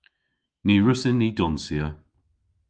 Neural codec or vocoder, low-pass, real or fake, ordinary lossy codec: autoencoder, 48 kHz, 128 numbers a frame, DAC-VAE, trained on Japanese speech; 9.9 kHz; fake; Opus, 24 kbps